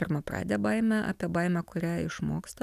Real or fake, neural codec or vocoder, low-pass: real; none; 14.4 kHz